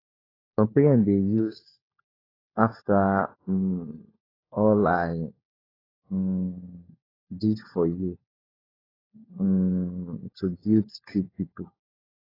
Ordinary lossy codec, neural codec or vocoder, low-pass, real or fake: AAC, 24 kbps; codec, 16 kHz, 4 kbps, FunCodec, trained on LibriTTS, 50 frames a second; 5.4 kHz; fake